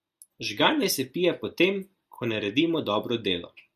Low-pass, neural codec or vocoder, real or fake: 14.4 kHz; none; real